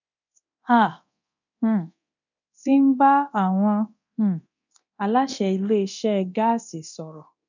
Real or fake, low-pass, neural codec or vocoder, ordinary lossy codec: fake; 7.2 kHz; codec, 24 kHz, 0.9 kbps, DualCodec; none